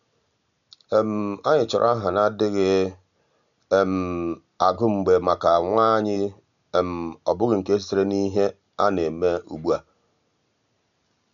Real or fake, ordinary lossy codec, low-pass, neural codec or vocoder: real; none; 7.2 kHz; none